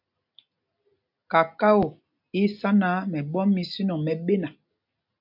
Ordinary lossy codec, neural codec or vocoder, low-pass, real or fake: MP3, 48 kbps; none; 5.4 kHz; real